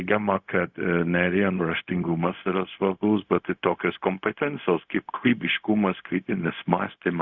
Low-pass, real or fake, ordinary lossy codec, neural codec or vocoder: 7.2 kHz; fake; Opus, 64 kbps; codec, 16 kHz, 0.4 kbps, LongCat-Audio-Codec